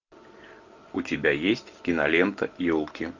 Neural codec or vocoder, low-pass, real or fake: none; 7.2 kHz; real